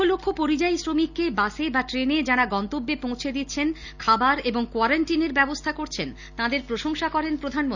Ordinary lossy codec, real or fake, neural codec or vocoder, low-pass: none; real; none; 7.2 kHz